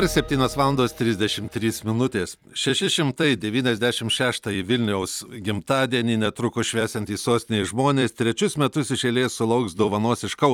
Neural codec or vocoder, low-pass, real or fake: vocoder, 44.1 kHz, 128 mel bands every 256 samples, BigVGAN v2; 19.8 kHz; fake